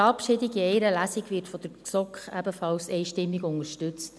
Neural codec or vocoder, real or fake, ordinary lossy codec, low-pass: none; real; none; none